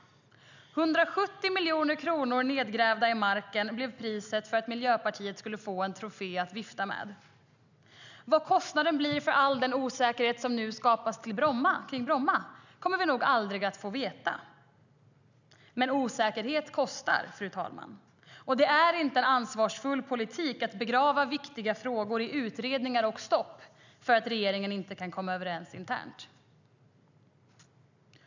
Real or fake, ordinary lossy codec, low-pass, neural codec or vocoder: real; none; 7.2 kHz; none